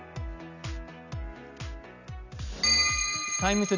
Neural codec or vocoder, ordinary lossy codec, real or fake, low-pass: none; none; real; 7.2 kHz